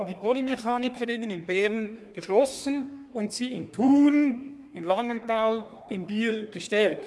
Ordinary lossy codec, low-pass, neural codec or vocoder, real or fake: none; none; codec, 24 kHz, 1 kbps, SNAC; fake